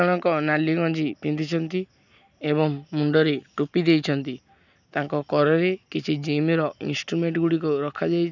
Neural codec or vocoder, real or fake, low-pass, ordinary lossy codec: none; real; 7.2 kHz; none